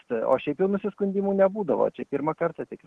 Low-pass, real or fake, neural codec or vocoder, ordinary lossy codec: 10.8 kHz; real; none; Opus, 16 kbps